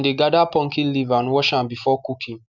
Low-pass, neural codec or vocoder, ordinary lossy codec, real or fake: 7.2 kHz; none; none; real